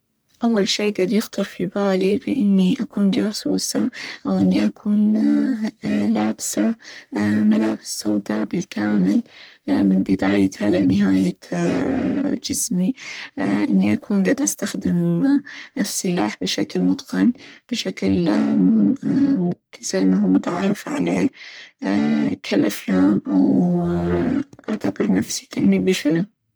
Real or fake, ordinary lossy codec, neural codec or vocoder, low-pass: fake; none; codec, 44.1 kHz, 1.7 kbps, Pupu-Codec; none